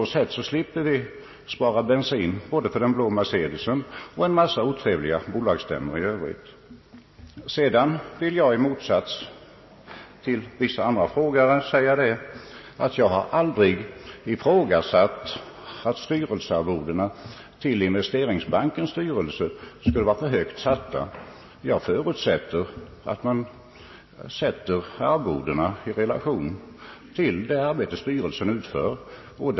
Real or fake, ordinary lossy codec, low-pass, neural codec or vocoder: real; MP3, 24 kbps; 7.2 kHz; none